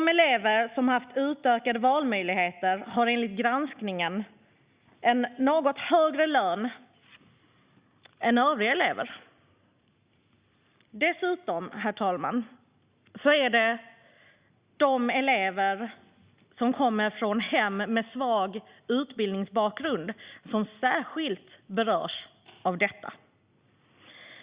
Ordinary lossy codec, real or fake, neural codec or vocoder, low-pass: Opus, 64 kbps; real; none; 3.6 kHz